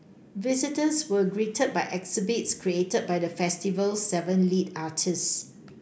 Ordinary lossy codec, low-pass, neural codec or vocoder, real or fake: none; none; none; real